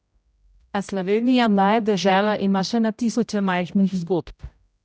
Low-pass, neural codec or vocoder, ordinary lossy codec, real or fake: none; codec, 16 kHz, 0.5 kbps, X-Codec, HuBERT features, trained on general audio; none; fake